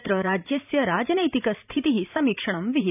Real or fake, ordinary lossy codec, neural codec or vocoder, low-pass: fake; none; vocoder, 44.1 kHz, 128 mel bands every 512 samples, BigVGAN v2; 3.6 kHz